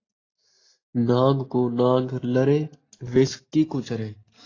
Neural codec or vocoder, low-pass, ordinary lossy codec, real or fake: none; 7.2 kHz; AAC, 32 kbps; real